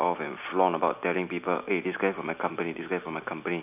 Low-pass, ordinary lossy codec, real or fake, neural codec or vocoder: 3.6 kHz; none; real; none